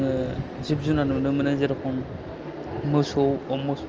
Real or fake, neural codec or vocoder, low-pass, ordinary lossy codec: real; none; 7.2 kHz; Opus, 24 kbps